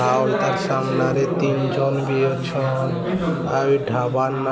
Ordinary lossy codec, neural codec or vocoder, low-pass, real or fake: none; none; none; real